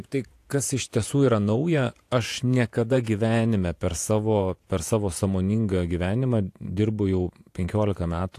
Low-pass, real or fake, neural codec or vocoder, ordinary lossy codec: 14.4 kHz; fake; vocoder, 48 kHz, 128 mel bands, Vocos; AAC, 64 kbps